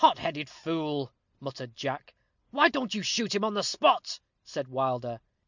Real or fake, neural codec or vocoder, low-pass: real; none; 7.2 kHz